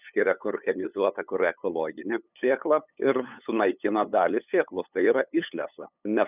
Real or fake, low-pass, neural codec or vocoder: fake; 3.6 kHz; codec, 16 kHz, 8 kbps, FunCodec, trained on LibriTTS, 25 frames a second